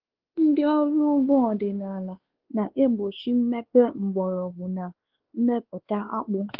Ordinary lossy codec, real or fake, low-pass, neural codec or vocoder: Opus, 16 kbps; fake; 5.4 kHz; codec, 16 kHz, 2 kbps, X-Codec, WavLM features, trained on Multilingual LibriSpeech